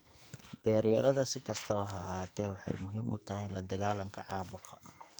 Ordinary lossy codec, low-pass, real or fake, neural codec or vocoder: none; none; fake; codec, 44.1 kHz, 2.6 kbps, SNAC